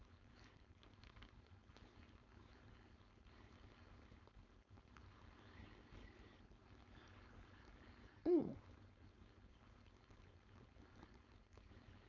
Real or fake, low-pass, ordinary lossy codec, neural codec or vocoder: fake; 7.2 kHz; none; codec, 16 kHz, 4.8 kbps, FACodec